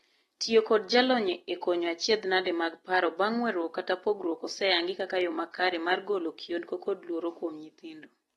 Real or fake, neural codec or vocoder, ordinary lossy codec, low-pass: real; none; AAC, 32 kbps; 19.8 kHz